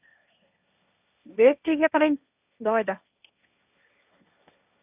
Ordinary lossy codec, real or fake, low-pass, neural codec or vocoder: none; fake; 3.6 kHz; codec, 16 kHz, 1.1 kbps, Voila-Tokenizer